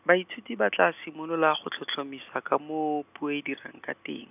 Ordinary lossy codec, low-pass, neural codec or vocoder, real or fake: none; 3.6 kHz; none; real